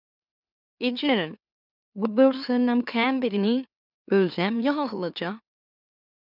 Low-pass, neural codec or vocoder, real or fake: 5.4 kHz; autoencoder, 44.1 kHz, a latent of 192 numbers a frame, MeloTTS; fake